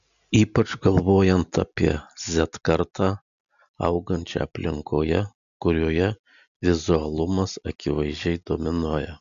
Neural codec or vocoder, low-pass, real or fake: none; 7.2 kHz; real